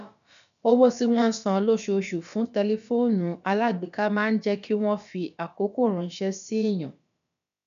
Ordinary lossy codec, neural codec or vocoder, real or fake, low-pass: none; codec, 16 kHz, about 1 kbps, DyCAST, with the encoder's durations; fake; 7.2 kHz